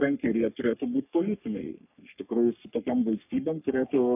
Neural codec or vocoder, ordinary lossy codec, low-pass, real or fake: codec, 44.1 kHz, 3.4 kbps, Pupu-Codec; AAC, 32 kbps; 3.6 kHz; fake